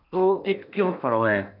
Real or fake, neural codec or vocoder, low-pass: fake; codec, 16 kHz in and 24 kHz out, 0.8 kbps, FocalCodec, streaming, 65536 codes; 5.4 kHz